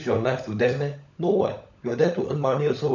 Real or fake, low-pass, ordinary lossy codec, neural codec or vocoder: fake; 7.2 kHz; none; codec, 16 kHz, 16 kbps, FunCodec, trained on LibriTTS, 50 frames a second